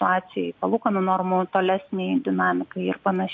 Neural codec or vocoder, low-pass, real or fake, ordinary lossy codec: none; 7.2 kHz; real; MP3, 48 kbps